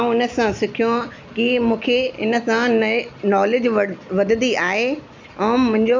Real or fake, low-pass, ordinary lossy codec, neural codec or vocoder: real; 7.2 kHz; MP3, 64 kbps; none